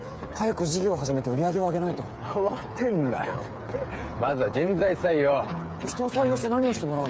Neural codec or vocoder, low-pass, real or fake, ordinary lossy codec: codec, 16 kHz, 8 kbps, FreqCodec, smaller model; none; fake; none